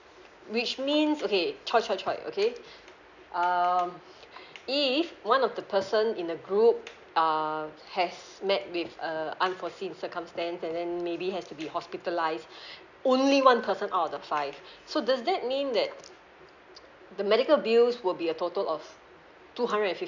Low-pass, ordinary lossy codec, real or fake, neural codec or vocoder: 7.2 kHz; none; real; none